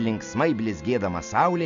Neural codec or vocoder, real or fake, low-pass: none; real; 7.2 kHz